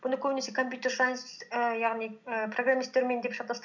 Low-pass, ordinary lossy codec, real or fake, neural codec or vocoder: 7.2 kHz; none; real; none